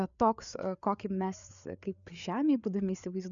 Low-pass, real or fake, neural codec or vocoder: 7.2 kHz; fake; codec, 16 kHz, 4 kbps, FreqCodec, larger model